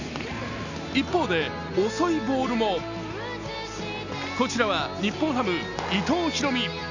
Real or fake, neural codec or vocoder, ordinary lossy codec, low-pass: real; none; none; 7.2 kHz